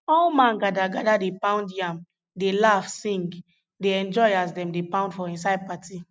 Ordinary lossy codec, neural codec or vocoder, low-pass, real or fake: none; none; none; real